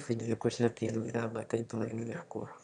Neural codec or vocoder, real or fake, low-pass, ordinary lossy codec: autoencoder, 22.05 kHz, a latent of 192 numbers a frame, VITS, trained on one speaker; fake; 9.9 kHz; none